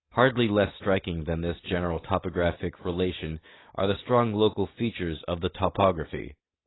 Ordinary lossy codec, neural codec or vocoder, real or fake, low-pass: AAC, 16 kbps; none; real; 7.2 kHz